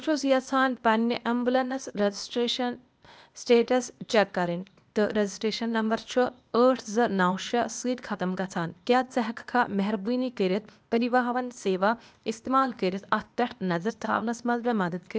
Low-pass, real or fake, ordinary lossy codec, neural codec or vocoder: none; fake; none; codec, 16 kHz, 0.8 kbps, ZipCodec